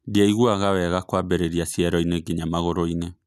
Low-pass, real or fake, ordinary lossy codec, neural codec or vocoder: 14.4 kHz; real; none; none